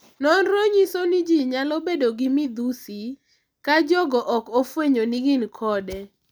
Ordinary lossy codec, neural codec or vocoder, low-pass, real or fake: none; none; none; real